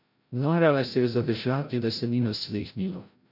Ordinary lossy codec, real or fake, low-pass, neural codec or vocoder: none; fake; 5.4 kHz; codec, 16 kHz, 0.5 kbps, FreqCodec, larger model